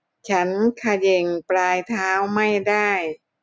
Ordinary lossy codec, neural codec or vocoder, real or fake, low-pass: none; none; real; none